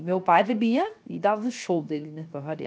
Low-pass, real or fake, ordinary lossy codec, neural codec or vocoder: none; fake; none; codec, 16 kHz, 0.7 kbps, FocalCodec